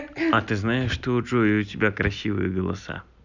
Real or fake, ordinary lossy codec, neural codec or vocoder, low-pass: real; none; none; 7.2 kHz